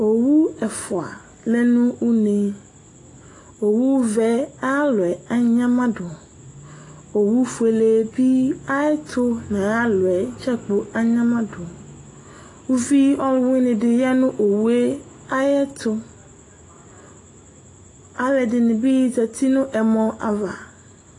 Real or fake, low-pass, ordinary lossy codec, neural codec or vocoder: real; 10.8 kHz; AAC, 32 kbps; none